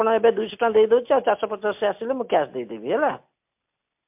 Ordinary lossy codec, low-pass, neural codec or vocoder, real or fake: MP3, 32 kbps; 3.6 kHz; none; real